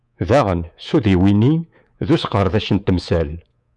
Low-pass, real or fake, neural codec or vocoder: 10.8 kHz; fake; codec, 24 kHz, 3.1 kbps, DualCodec